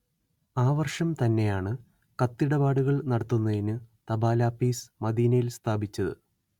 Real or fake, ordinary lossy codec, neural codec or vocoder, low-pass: real; Opus, 64 kbps; none; 19.8 kHz